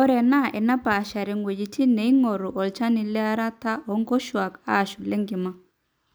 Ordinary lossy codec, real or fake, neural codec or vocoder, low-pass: none; real; none; none